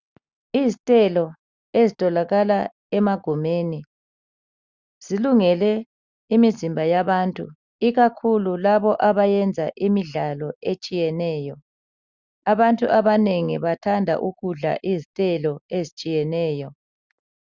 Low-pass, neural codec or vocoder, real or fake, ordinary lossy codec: 7.2 kHz; none; real; Opus, 64 kbps